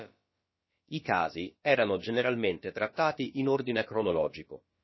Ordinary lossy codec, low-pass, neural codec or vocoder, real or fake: MP3, 24 kbps; 7.2 kHz; codec, 16 kHz, about 1 kbps, DyCAST, with the encoder's durations; fake